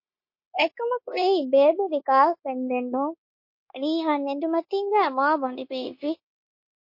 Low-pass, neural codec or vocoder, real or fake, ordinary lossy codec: 5.4 kHz; codec, 16 kHz, 0.9 kbps, LongCat-Audio-Codec; fake; MP3, 32 kbps